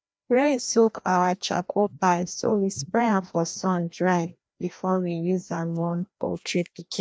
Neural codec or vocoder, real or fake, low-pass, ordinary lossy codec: codec, 16 kHz, 1 kbps, FreqCodec, larger model; fake; none; none